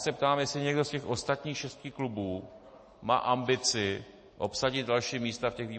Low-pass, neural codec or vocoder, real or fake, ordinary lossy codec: 10.8 kHz; none; real; MP3, 32 kbps